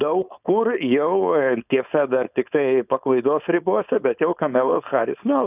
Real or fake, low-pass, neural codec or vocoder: fake; 3.6 kHz; codec, 16 kHz, 4.8 kbps, FACodec